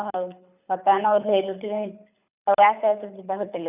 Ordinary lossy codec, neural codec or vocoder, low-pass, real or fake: none; codec, 24 kHz, 6 kbps, HILCodec; 3.6 kHz; fake